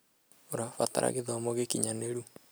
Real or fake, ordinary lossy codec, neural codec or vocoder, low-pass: real; none; none; none